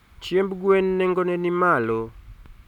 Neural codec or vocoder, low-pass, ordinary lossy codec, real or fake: none; 19.8 kHz; none; real